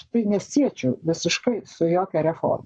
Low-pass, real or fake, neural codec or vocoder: 9.9 kHz; fake; codec, 44.1 kHz, 7.8 kbps, Pupu-Codec